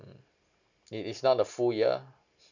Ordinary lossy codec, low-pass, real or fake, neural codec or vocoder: none; 7.2 kHz; real; none